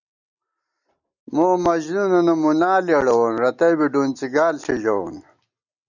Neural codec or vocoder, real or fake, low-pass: none; real; 7.2 kHz